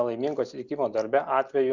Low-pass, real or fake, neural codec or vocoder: 7.2 kHz; real; none